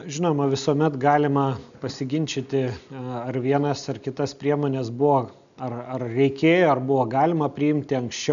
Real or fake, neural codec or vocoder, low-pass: real; none; 7.2 kHz